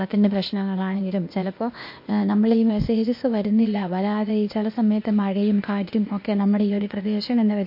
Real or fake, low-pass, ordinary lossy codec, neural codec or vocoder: fake; 5.4 kHz; MP3, 32 kbps; codec, 16 kHz, 0.8 kbps, ZipCodec